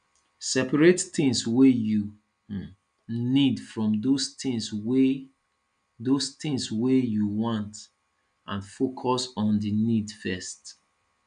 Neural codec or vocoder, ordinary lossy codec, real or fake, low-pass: none; none; real; 9.9 kHz